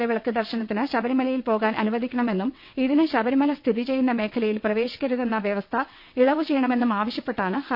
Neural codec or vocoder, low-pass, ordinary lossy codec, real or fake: vocoder, 22.05 kHz, 80 mel bands, WaveNeXt; 5.4 kHz; MP3, 48 kbps; fake